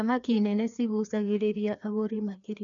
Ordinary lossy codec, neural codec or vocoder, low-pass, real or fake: none; codec, 16 kHz, 2 kbps, FreqCodec, larger model; 7.2 kHz; fake